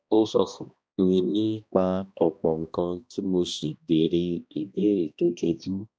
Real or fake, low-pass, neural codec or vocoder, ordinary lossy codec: fake; none; codec, 16 kHz, 1 kbps, X-Codec, HuBERT features, trained on balanced general audio; none